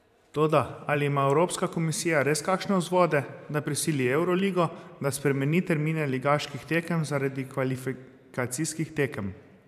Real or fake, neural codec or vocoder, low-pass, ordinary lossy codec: fake; vocoder, 44.1 kHz, 128 mel bands every 512 samples, BigVGAN v2; 14.4 kHz; none